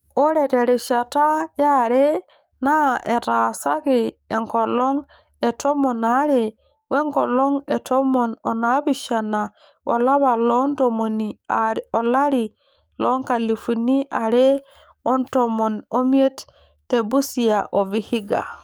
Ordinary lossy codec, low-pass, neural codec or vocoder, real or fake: none; none; codec, 44.1 kHz, 7.8 kbps, DAC; fake